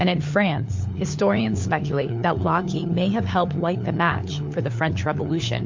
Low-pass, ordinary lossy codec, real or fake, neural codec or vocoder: 7.2 kHz; MP3, 48 kbps; fake; codec, 16 kHz, 4 kbps, FunCodec, trained on LibriTTS, 50 frames a second